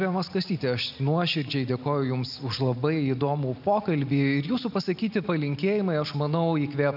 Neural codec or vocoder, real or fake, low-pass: none; real; 5.4 kHz